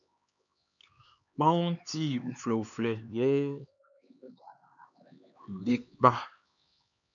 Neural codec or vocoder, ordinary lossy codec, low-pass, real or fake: codec, 16 kHz, 2 kbps, X-Codec, HuBERT features, trained on LibriSpeech; MP3, 96 kbps; 7.2 kHz; fake